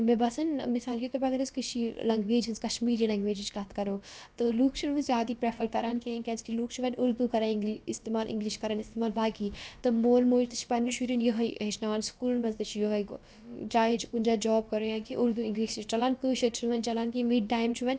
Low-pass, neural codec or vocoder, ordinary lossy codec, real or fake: none; codec, 16 kHz, about 1 kbps, DyCAST, with the encoder's durations; none; fake